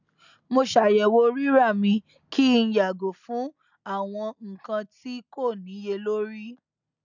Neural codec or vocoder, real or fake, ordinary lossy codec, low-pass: none; real; none; 7.2 kHz